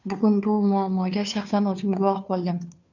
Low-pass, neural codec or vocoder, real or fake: 7.2 kHz; codec, 16 kHz, 2 kbps, FunCodec, trained on Chinese and English, 25 frames a second; fake